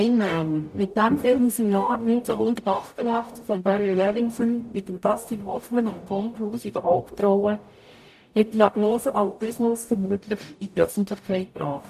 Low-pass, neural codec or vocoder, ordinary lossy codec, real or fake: 14.4 kHz; codec, 44.1 kHz, 0.9 kbps, DAC; none; fake